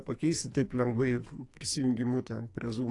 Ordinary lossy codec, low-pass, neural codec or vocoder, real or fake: AAC, 48 kbps; 10.8 kHz; codec, 44.1 kHz, 2.6 kbps, SNAC; fake